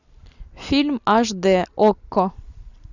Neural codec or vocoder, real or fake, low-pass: none; real; 7.2 kHz